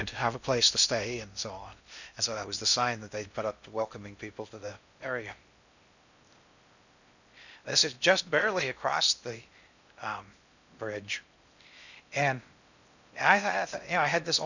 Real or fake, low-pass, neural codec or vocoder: fake; 7.2 kHz; codec, 16 kHz in and 24 kHz out, 0.6 kbps, FocalCodec, streaming, 2048 codes